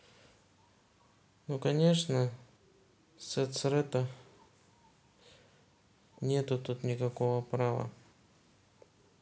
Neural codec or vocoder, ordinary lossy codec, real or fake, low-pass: none; none; real; none